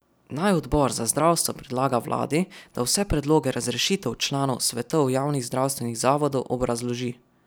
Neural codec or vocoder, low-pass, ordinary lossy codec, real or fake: none; none; none; real